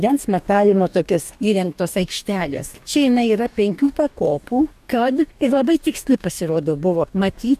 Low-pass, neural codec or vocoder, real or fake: 14.4 kHz; codec, 44.1 kHz, 2.6 kbps, DAC; fake